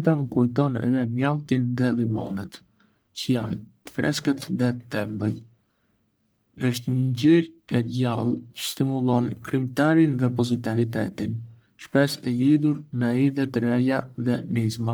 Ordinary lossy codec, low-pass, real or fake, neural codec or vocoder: none; none; fake; codec, 44.1 kHz, 1.7 kbps, Pupu-Codec